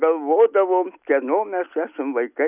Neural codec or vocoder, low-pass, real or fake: none; 3.6 kHz; real